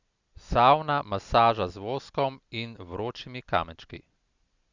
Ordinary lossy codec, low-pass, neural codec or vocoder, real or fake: Opus, 64 kbps; 7.2 kHz; none; real